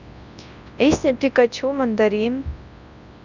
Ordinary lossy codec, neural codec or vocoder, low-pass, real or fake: none; codec, 24 kHz, 0.9 kbps, WavTokenizer, large speech release; 7.2 kHz; fake